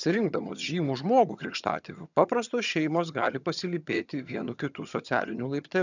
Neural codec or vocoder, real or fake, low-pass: vocoder, 22.05 kHz, 80 mel bands, HiFi-GAN; fake; 7.2 kHz